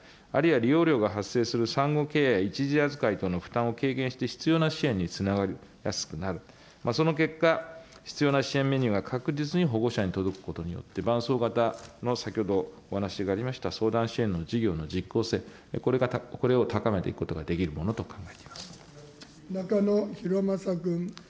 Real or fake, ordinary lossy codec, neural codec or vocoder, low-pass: real; none; none; none